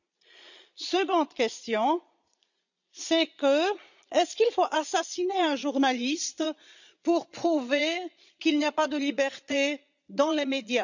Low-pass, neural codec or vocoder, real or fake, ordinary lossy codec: 7.2 kHz; vocoder, 22.05 kHz, 80 mel bands, Vocos; fake; none